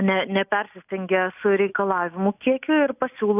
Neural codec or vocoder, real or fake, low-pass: none; real; 3.6 kHz